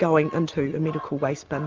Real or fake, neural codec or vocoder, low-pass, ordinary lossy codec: real; none; 7.2 kHz; Opus, 32 kbps